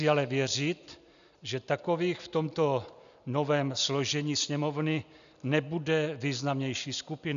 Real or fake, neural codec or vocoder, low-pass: real; none; 7.2 kHz